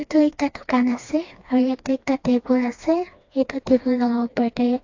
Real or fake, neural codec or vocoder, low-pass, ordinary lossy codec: fake; codec, 16 kHz, 2 kbps, FreqCodec, smaller model; 7.2 kHz; AAC, 48 kbps